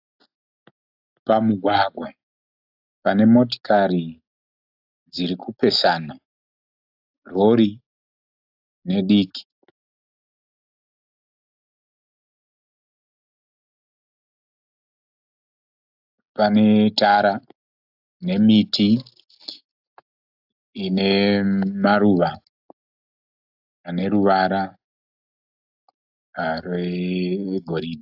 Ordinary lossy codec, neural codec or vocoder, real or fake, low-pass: AAC, 48 kbps; none; real; 5.4 kHz